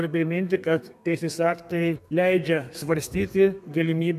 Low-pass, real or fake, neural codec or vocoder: 14.4 kHz; fake; codec, 32 kHz, 1.9 kbps, SNAC